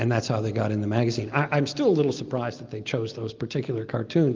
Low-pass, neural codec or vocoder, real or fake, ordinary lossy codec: 7.2 kHz; none; real; Opus, 32 kbps